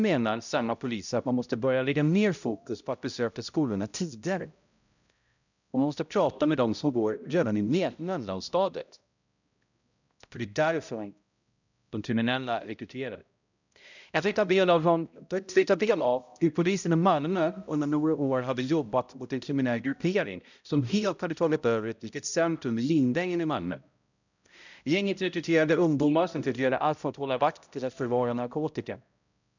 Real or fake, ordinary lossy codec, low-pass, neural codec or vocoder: fake; none; 7.2 kHz; codec, 16 kHz, 0.5 kbps, X-Codec, HuBERT features, trained on balanced general audio